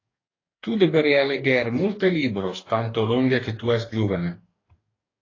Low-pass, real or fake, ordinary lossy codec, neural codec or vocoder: 7.2 kHz; fake; AAC, 32 kbps; codec, 44.1 kHz, 2.6 kbps, DAC